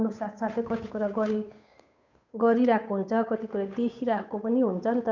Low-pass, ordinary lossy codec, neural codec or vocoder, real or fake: 7.2 kHz; none; codec, 16 kHz, 8 kbps, FunCodec, trained on Chinese and English, 25 frames a second; fake